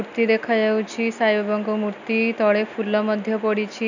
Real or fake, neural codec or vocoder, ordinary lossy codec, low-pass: real; none; none; 7.2 kHz